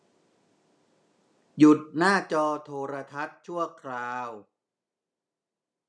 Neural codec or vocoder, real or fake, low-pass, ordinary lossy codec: none; real; none; none